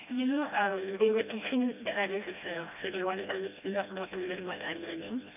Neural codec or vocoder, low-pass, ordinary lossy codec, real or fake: codec, 16 kHz, 1 kbps, FreqCodec, smaller model; 3.6 kHz; none; fake